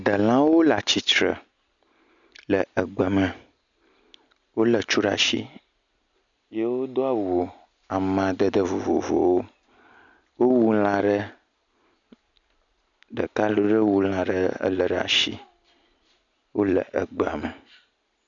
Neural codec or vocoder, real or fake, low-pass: none; real; 7.2 kHz